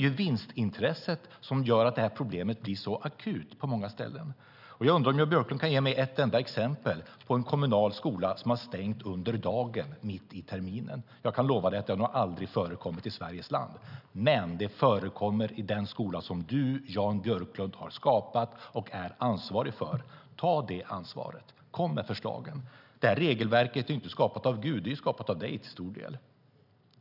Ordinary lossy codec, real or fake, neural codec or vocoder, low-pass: none; real; none; 5.4 kHz